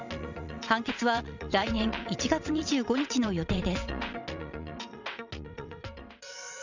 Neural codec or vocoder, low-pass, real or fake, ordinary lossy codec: vocoder, 22.05 kHz, 80 mel bands, WaveNeXt; 7.2 kHz; fake; none